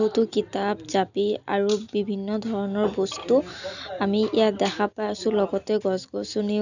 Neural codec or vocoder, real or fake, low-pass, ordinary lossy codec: none; real; 7.2 kHz; none